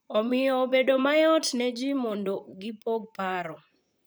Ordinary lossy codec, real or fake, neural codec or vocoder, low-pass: none; fake; vocoder, 44.1 kHz, 128 mel bands, Pupu-Vocoder; none